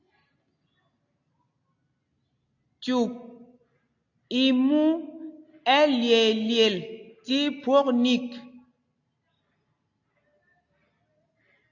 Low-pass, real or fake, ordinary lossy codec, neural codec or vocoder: 7.2 kHz; real; AAC, 48 kbps; none